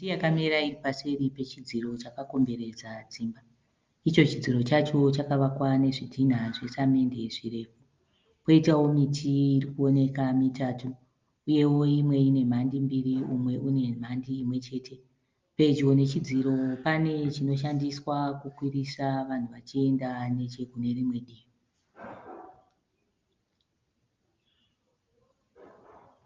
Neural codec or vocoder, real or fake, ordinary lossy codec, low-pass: none; real; Opus, 32 kbps; 7.2 kHz